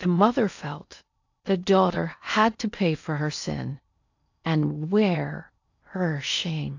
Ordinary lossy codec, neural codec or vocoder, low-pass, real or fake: AAC, 48 kbps; codec, 16 kHz in and 24 kHz out, 0.8 kbps, FocalCodec, streaming, 65536 codes; 7.2 kHz; fake